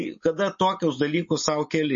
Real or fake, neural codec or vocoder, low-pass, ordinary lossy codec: real; none; 7.2 kHz; MP3, 32 kbps